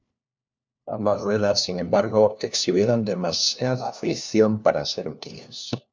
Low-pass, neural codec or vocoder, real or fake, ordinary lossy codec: 7.2 kHz; codec, 16 kHz, 1 kbps, FunCodec, trained on LibriTTS, 50 frames a second; fake; MP3, 64 kbps